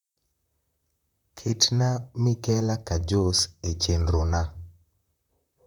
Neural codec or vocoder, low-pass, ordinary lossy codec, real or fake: vocoder, 44.1 kHz, 128 mel bands, Pupu-Vocoder; 19.8 kHz; none; fake